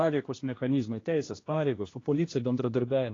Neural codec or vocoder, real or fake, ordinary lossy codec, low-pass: codec, 16 kHz, 1 kbps, X-Codec, HuBERT features, trained on general audio; fake; AAC, 32 kbps; 7.2 kHz